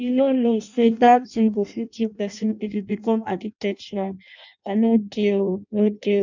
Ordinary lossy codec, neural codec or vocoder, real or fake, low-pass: none; codec, 16 kHz in and 24 kHz out, 0.6 kbps, FireRedTTS-2 codec; fake; 7.2 kHz